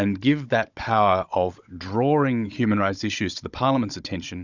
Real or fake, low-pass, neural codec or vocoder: fake; 7.2 kHz; codec, 16 kHz, 16 kbps, FunCodec, trained on Chinese and English, 50 frames a second